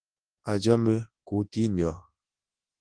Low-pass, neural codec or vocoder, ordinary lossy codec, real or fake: 9.9 kHz; codec, 24 kHz, 0.9 kbps, WavTokenizer, large speech release; Opus, 16 kbps; fake